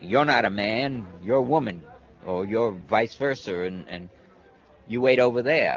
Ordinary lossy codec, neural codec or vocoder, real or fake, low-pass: Opus, 32 kbps; none; real; 7.2 kHz